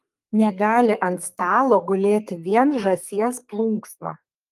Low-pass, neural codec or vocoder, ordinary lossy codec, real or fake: 14.4 kHz; codec, 32 kHz, 1.9 kbps, SNAC; Opus, 32 kbps; fake